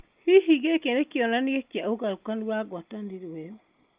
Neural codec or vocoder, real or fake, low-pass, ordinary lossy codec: none; real; 3.6 kHz; Opus, 24 kbps